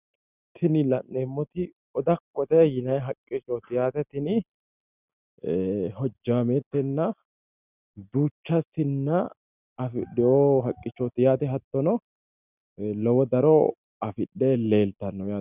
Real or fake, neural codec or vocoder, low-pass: real; none; 3.6 kHz